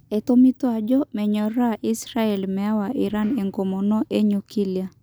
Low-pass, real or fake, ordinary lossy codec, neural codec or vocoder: none; real; none; none